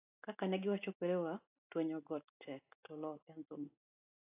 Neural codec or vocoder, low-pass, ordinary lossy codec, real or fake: none; 3.6 kHz; AAC, 24 kbps; real